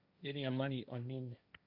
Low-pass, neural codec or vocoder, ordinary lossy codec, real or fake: 5.4 kHz; codec, 16 kHz, 1.1 kbps, Voila-Tokenizer; MP3, 48 kbps; fake